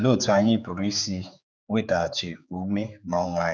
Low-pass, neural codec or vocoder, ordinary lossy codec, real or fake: none; codec, 16 kHz, 4 kbps, X-Codec, HuBERT features, trained on general audio; none; fake